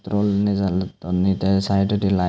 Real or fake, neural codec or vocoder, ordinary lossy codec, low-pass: real; none; none; none